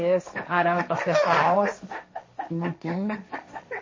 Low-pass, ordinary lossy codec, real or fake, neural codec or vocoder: 7.2 kHz; MP3, 32 kbps; fake; codec, 16 kHz, 1.1 kbps, Voila-Tokenizer